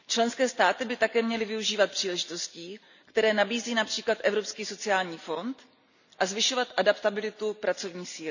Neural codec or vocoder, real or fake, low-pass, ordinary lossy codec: none; real; 7.2 kHz; none